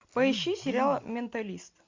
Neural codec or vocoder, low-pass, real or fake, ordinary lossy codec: none; 7.2 kHz; real; MP3, 64 kbps